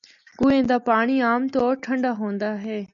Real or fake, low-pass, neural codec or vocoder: real; 7.2 kHz; none